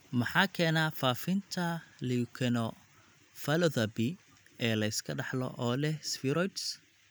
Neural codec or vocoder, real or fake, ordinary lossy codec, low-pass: none; real; none; none